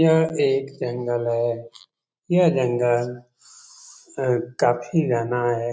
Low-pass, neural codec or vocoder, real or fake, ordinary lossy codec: none; none; real; none